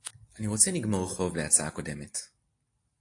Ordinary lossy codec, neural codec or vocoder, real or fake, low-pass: AAC, 48 kbps; none; real; 10.8 kHz